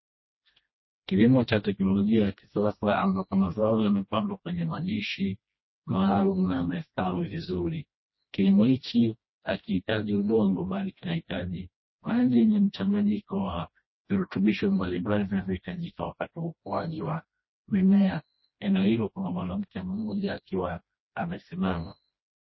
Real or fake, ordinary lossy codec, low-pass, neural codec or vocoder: fake; MP3, 24 kbps; 7.2 kHz; codec, 16 kHz, 1 kbps, FreqCodec, smaller model